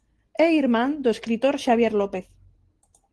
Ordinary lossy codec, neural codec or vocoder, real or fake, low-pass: Opus, 16 kbps; none; real; 10.8 kHz